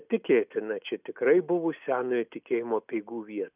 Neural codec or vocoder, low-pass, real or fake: none; 3.6 kHz; real